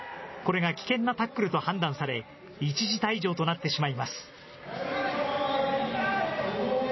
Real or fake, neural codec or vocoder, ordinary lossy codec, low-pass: real; none; MP3, 24 kbps; 7.2 kHz